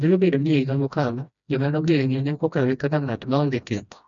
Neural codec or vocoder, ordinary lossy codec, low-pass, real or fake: codec, 16 kHz, 1 kbps, FreqCodec, smaller model; none; 7.2 kHz; fake